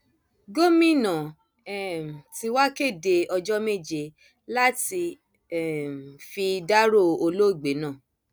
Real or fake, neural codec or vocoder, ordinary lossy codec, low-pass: real; none; none; none